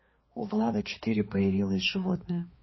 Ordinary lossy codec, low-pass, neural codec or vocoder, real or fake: MP3, 24 kbps; 7.2 kHz; codec, 16 kHz, 2 kbps, X-Codec, HuBERT features, trained on balanced general audio; fake